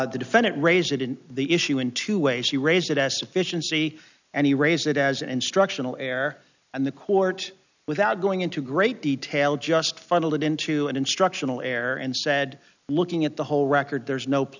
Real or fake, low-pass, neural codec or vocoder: real; 7.2 kHz; none